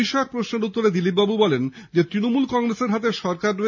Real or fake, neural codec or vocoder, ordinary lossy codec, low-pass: real; none; none; 7.2 kHz